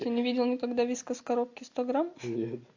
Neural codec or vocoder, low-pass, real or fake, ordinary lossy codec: none; 7.2 kHz; real; AAC, 48 kbps